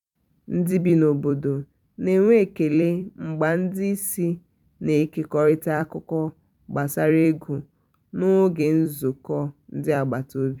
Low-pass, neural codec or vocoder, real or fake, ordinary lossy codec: 19.8 kHz; vocoder, 44.1 kHz, 128 mel bands every 256 samples, BigVGAN v2; fake; none